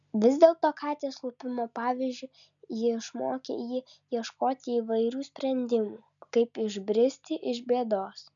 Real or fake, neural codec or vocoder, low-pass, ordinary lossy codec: real; none; 7.2 kHz; MP3, 96 kbps